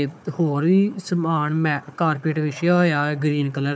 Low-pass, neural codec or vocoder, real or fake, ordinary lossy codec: none; codec, 16 kHz, 4 kbps, FunCodec, trained on Chinese and English, 50 frames a second; fake; none